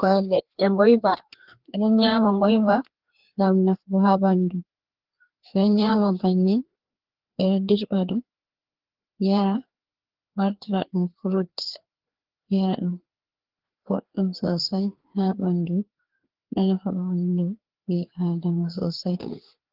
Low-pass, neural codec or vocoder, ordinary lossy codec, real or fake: 5.4 kHz; codec, 16 kHz, 2 kbps, FreqCodec, larger model; Opus, 16 kbps; fake